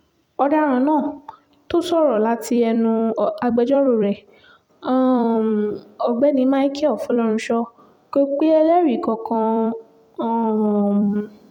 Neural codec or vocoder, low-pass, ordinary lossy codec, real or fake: vocoder, 44.1 kHz, 128 mel bands every 256 samples, BigVGAN v2; 19.8 kHz; none; fake